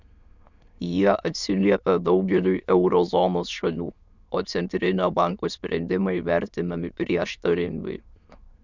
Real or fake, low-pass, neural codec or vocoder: fake; 7.2 kHz; autoencoder, 22.05 kHz, a latent of 192 numbers a frame, VITS, trained on many speakers